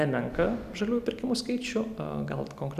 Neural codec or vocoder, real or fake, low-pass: none; real; 14.4 kHz